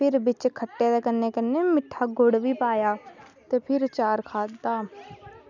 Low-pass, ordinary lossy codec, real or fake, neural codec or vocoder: 7.2 kHz; none; real; none